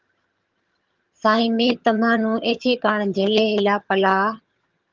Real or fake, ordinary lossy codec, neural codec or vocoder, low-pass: fake; Opus, 32 kbps; vocoder, 22.05 kHz, 80 mel bands, HiFi-GAN; 7.2 kHz